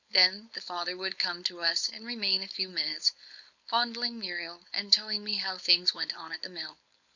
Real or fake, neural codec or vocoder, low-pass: fake; codec, 16 kHz, 4.8 kbps, FACodec; 7.2 kHz